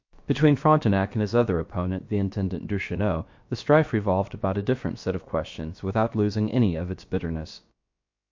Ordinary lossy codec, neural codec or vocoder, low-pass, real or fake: MP3, 48 kbps; codec, 16 kHz, about 1 kbps, DyCAST, with the encoder's durations; 7.2 kHz; fake